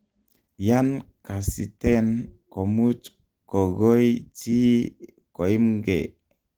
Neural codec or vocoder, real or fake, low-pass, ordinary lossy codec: none; real; 19.8 kHz; Opus, 16 kbps